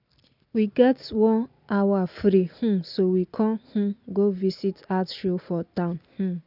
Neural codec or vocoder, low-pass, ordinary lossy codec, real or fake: none; 5.4 kHz; none; real